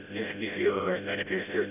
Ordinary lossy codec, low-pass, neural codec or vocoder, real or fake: none; 3.6 kHz; codec, 16 kHz, 0.5 kbps, FreqCodec, smaller model; fake